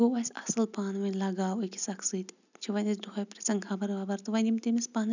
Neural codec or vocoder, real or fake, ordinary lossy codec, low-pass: none; real; none; 7.2 kHz